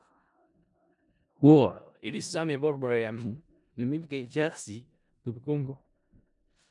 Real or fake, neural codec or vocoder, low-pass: fake; codec, 16 kHz in and 24 kHz out, 0.4 kbps, LongCat-Audio-Codec, four codebook decoder; 10.8 kHz